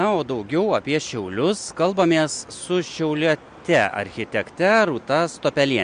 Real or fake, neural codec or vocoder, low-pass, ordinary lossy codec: real; none; 9.9 kHz; MP3, 64 kbps